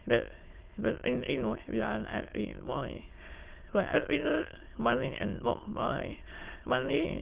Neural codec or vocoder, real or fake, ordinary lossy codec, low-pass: autoencoder, 22.05 kHz, a latent of 192 numbers a frame, VITS, trained on many speakers; fake; Opus, 16 kbps; 3.6 kHz